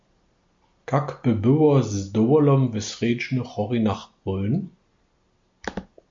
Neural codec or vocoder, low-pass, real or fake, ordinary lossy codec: none; 7.2 kHz; real; MP3, 48 kbps